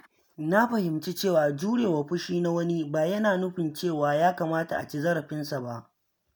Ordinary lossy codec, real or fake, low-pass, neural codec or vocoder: none; real; none; none